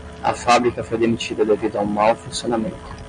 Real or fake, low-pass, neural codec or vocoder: real; 9.9 kHz; none